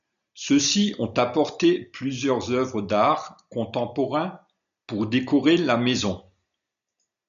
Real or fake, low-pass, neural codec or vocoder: real; 7.2 kHz; none